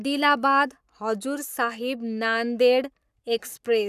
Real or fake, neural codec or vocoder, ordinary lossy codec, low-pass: fake; codec, 44.1 kHz, 7.8 kbps, Pupu-Codec; none; 14.4 kHz